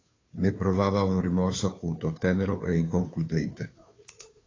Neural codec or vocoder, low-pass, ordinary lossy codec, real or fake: codec, 16 kHz, 2 kbps, FunCodec, trained on Chinese and English, 25 frames a second; 7.2 kHz; AAC, 32 kbps; fake